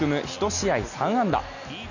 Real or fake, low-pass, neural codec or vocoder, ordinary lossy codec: real; 7.2 kHz; none; none